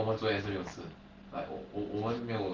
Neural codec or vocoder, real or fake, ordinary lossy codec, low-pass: none; real; Opus, 16 kbps; 7.2 kHz